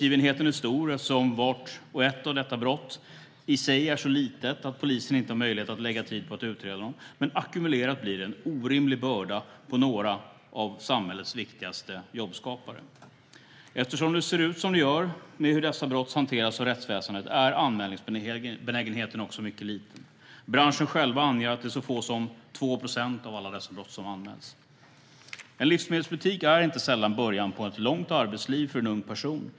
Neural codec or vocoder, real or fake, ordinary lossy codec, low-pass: none; real; none; none